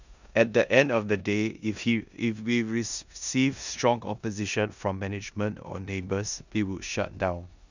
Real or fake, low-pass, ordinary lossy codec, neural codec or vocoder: fake; 7.2 kHz; none; codec, 16 kHz in and 24 kHz out, 0.9 kbps, LongCat-Audio-Codec, four codebook decoder